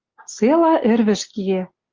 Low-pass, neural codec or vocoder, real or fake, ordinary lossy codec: 7.2 kHz; none; real; Opus, 24 kbps